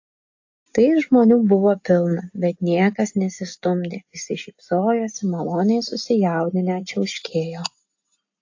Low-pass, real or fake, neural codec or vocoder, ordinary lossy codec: 7.2 kHz; real; none; AAC, 48 kbps